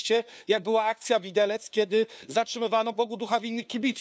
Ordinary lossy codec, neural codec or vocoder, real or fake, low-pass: none; codec, 16 kHz, 2 kbps, FunCodec, trained on LibriTTS, 25 frames a second; fake; none